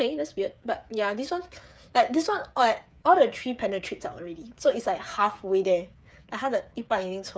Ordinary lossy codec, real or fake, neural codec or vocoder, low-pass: none; fake; codec, 16 kHz, 8 kbps, FreqCodec, smaller model; none